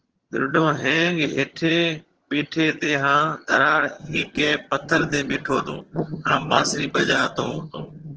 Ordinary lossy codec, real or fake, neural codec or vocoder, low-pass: Opus, 16 kbps; fake; vocoder, 22.05 kHz, 80 mel bands, HiFi-GAN; 7.2 kHz